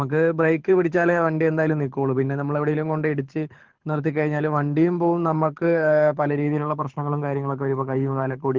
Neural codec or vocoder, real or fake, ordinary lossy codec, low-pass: codec, 24 kHz, 6 kbps, HILCodec; fake; Opus, 16 kbps; 7.2 kHz